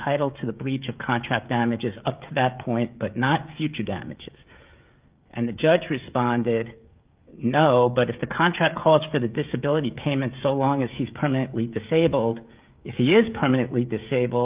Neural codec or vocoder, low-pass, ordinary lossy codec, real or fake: codec, 16 kHz, 16 kbps, FreqCodec, smaller model; 3.6 kHz; Opus, 24 kbps; fake